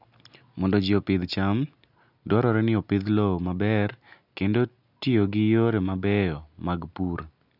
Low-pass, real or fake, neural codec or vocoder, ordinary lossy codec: 5.4 kHz; real; none; none